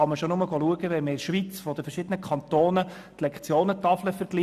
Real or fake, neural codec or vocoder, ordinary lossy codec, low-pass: real; none; none; 14.4 kHz